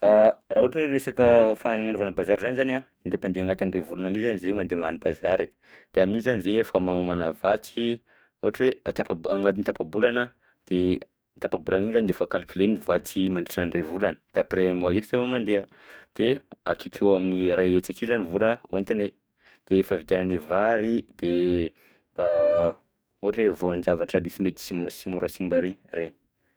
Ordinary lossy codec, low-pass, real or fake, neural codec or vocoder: none; none; fake; codec, 44.1 kHz, 2.6 kbps, DAC